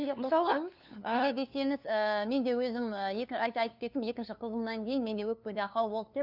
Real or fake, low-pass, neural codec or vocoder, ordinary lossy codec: fake; 5.4 kHz; codec, 16 kHz, 2 kbps, FunCodec, trained on LibriTTS, 25 frames a second; none